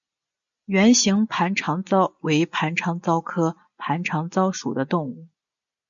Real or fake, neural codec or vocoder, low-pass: real; none; 7.2 kHz